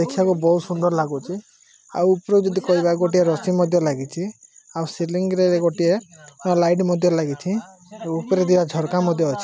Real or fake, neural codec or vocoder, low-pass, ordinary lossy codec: real; none; none; none